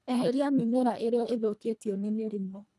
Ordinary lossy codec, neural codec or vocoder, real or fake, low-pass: none; codec, 24 kHz, 1.5 kbps, HILCodec; fake; none